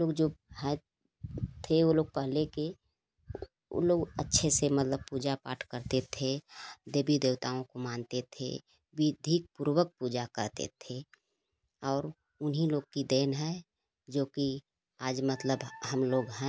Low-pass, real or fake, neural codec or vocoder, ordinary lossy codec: none; real; none; none